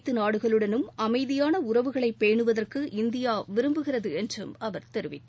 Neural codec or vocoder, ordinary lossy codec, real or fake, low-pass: none; none; real; none